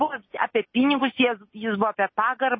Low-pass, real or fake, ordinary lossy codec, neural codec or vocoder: 7.2 kHz; real; MP3, 24 kbps; none